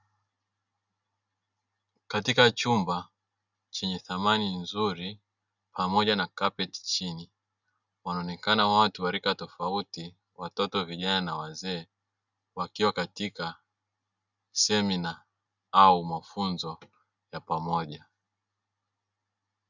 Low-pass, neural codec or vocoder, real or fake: 7.2 kHz; none; real